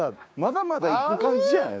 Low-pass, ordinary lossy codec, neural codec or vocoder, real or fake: none; none; codec, 16 kHz, 6 kbps, DAC; fake